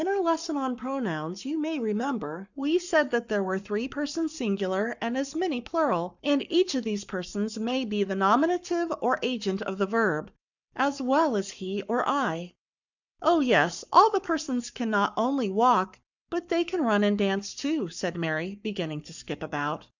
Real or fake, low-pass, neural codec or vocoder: fake; 7.2 kHz; codec, 44.1 kHz, 7.8 kbps, Pupu-Codec